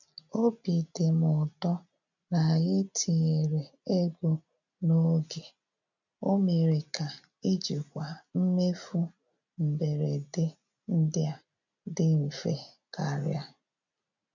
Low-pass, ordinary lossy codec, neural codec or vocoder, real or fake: 7.2 kHz; none; none; real